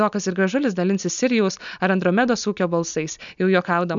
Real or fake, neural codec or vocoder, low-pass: real; none; 7.2 kHz